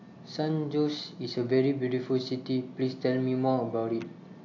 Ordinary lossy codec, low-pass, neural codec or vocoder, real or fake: AAC, 48 kbps; 7.2 kHz; none; real